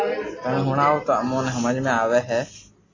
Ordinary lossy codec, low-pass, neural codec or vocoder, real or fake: AAC, 32 kbps; 7.2 kHz; none; real